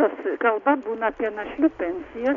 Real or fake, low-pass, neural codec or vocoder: real; 7.2 kHz; none